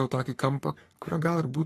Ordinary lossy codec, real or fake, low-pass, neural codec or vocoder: AAC, 48 kbps; fake; 14.4 kHz; codec, 44.1 kHz, 3.4 kbps, Pupu-Codec